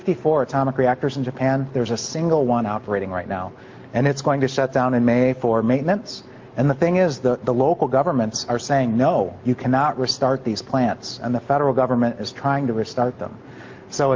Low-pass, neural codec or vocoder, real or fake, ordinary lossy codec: 7.2 kHz; none; real; Opus, 24 kbps